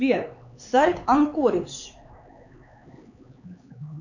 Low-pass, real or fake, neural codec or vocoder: 7.2 kHz; fake; codec, 16 kHz, 4 kbps, X-Codec, HuBERT features, trained on LibriSpeech